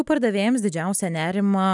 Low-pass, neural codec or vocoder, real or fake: 10.8 kHz; none; real